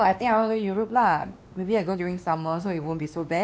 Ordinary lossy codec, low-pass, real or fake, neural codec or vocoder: none; none; fake; codec, 16 kHz, 2 kbps, X-Codec, WavLM features, trained on Multilingual LibriSpeech